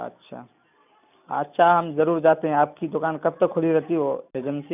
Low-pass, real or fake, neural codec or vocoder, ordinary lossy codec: 3.6 kHz; real; none; none